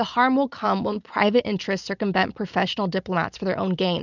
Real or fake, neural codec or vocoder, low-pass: real; none; 7.2 kHz